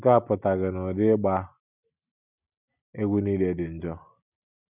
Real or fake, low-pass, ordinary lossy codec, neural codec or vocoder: real; 3.6 kHz; none; none